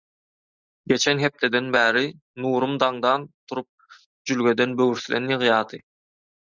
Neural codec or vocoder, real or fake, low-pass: none; real; 7.2 kHz